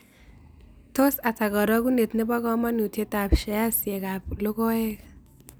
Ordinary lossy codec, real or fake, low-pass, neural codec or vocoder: none; real; none; none